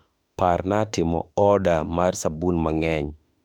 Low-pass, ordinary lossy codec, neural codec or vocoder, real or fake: 19.8 kHz; none; autoencoder, 48 kHz, 32 numbers a frame, DAC-VAE, trained on Japanese speech; fake